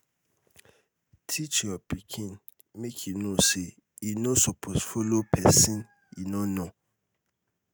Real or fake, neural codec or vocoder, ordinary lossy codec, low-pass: real; none; none; none